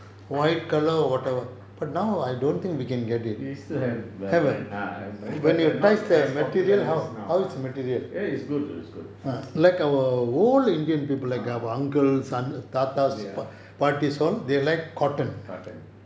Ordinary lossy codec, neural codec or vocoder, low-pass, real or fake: none; none; none; real